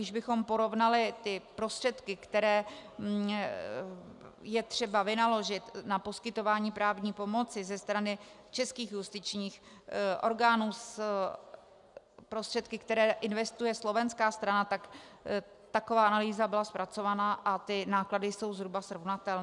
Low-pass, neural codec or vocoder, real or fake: 10.8 kHz; none; real